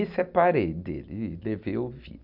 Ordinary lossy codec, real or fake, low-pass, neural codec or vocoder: none; real; 5.4 kHz; none